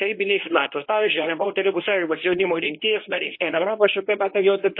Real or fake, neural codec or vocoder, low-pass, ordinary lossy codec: fake; codec, 24 kHz, 0.9 kbps, WavTokenizer, medium speech release version 1; 5.4 kHz; MP3, 24 kbps